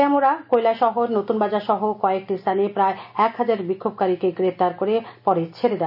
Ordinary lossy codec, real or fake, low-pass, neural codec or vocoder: none; real; 5.4 kHz; none